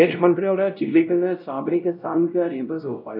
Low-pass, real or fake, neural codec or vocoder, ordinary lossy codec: 5.4 kHz; fake; codec, 16 kHz, 0.5 kbps, X-Codec, WavLM features, trained on Multilingual LibriSpeech; none